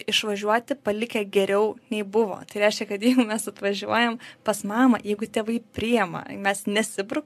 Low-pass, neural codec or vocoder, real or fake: 14.4 kHz; none; real